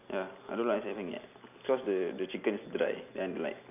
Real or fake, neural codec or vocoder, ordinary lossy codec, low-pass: real; none; none; 3.6 kHz